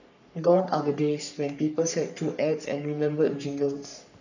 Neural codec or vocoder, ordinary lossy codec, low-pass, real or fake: codec, 44.1 kHz, 3.4 kbps, Pupu-Codec; none; 7.2 kHz; fake